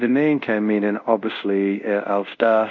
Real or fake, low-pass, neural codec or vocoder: fake; 7.2 kHz; codec, 24 kHz, 0.5 kbps, DualCodec